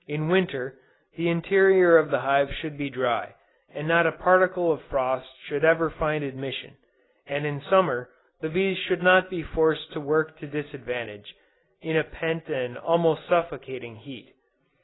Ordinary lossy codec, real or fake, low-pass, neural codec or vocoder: AAC, 16 kbps; real; 7.2 kHz; none